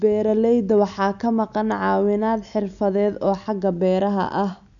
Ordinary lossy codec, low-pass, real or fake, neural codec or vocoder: none; 7.2 kHz; real; none